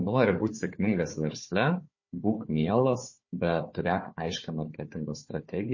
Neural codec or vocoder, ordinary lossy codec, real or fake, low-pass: codec, 16 kHz, 4 kbps, FunCodec, trained on Chinese and English, 50 frames a second; MP3, 32 kbps; fake; 7.2 kHz